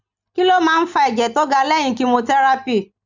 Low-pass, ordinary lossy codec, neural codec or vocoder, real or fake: 7.2 kHz; none; none; real